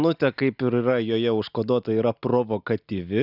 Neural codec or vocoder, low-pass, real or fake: none; 5.4 kHz; real